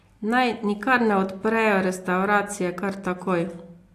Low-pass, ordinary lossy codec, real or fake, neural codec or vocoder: 14.4 kHz; AAC, 64 kbps; real; none